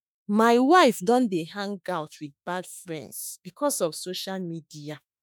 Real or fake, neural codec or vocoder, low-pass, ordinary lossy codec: fake; autoencoder, 48 kHz, 32 numbers a frame, DAC-VAE, trained on Japanese speech; none; none